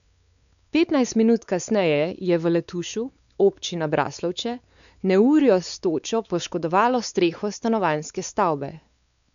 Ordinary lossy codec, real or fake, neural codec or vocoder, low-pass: none; fake; codec, 16 kHz, 4 kbps, X-Codec, WavLM features, trained on Multilingual LibriSpeech; 7.2 kHz